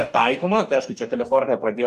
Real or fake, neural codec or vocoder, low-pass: fake; codec, 44.1 kHz, 2.6 kbps, DAC; 14.4 kHz